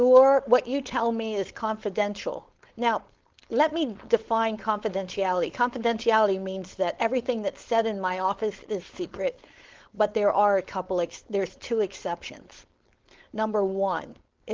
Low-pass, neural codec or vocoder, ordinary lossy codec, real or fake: 7.2 kHz; codec, 16 kHz, 4.8 kbps, FACodec; Opus, 16 kbps; fake